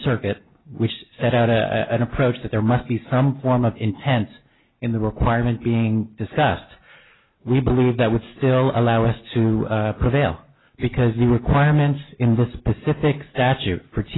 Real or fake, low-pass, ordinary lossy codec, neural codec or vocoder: real; 7.2 kHz; AAC, 16 kbps; none